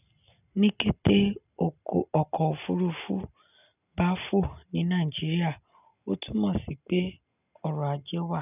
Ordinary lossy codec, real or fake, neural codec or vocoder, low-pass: none; real; none; 3.6 kHz